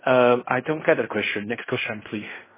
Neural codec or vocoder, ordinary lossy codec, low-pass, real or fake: codec, 16 kHz in and 24 kHz out, 0.4 kbps, LongCat-Audio-Codec, fine tuned four codebook decoder; MP3, 16 kbps; 3.6 kHz; fake